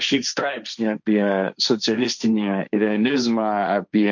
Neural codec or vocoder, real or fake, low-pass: codec, 16 kHz, 1.1 kbps, Voila-Tokenizer; fake; 7.2 kHz